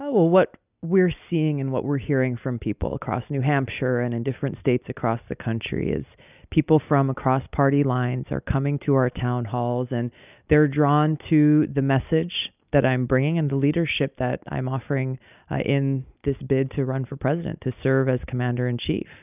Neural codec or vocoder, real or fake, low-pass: none; real; 3.6 kHz